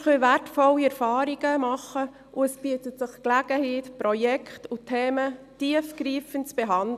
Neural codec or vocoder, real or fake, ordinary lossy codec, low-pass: none; real; none; 14.4 kHz